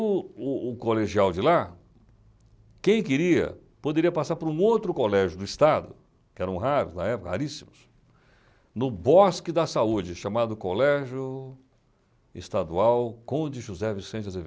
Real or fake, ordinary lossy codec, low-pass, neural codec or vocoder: real; none; none; none